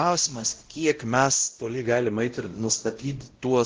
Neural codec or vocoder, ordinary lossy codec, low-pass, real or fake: codec, 16 kHz, 0.5 kbps, X-Codec, WavLM features, trained on Multilingual LibriSpeech; Opus, 16 kbps; 7.2 kHz; fake